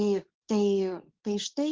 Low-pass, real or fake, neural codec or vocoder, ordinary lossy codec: 7.2 kHz; fake; codec, 24 kHz, 0.9 kbps, WavTokenizer, medium speech release version 2; Opus, 16 kbps